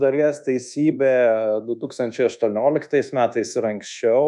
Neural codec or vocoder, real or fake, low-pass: codec, 24 kHz, 1.2 kbps, DualCodec; fake; 10.8 kHz